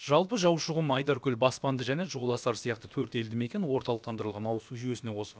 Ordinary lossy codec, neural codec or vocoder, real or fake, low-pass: none; codec, 16 kHz, about 1 kbps, DyCAST, with the encoder's durations; fake; none